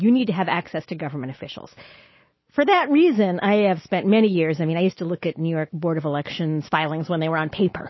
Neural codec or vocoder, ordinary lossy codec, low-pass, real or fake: none; MP3, 24 kbps; 7.2 kHz; real